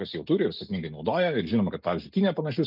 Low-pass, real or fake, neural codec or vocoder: 5.4 kHz; real; none